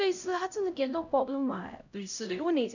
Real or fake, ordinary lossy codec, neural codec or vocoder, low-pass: fake; none; codec, 16 kHz, 0.5 kbps, X-Codec, HuBERT features, trained on LibriSpeech; 7.2 kHz